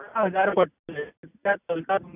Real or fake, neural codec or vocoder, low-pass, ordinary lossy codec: real; none; 3.6 kHz; none